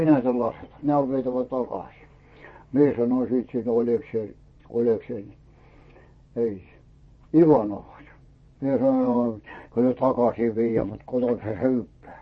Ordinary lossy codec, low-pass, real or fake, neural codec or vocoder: MP3, 32 kbps; 9.9 kHz; fake; vocoder, 22.05 kHz, 80 mel bands, WaveNeXt